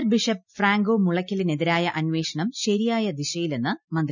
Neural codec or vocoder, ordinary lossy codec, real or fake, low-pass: none; none; real; 7.2 kHz